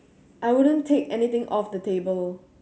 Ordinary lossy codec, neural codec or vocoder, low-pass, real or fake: none; none; none; real